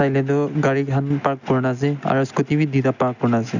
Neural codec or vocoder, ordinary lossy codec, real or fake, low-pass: none; none; real; 7.2 kHz